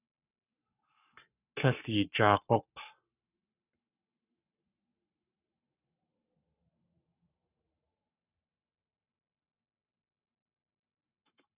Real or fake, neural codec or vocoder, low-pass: fake; codec, 44.1 kHz, 7.8 kbps, Pupu-Codec; 3.6 kHz